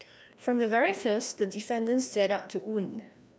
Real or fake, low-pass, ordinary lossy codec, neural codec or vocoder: fake; none; none; codec, 16 kHz, 1 kbps, FreqCodec, larger model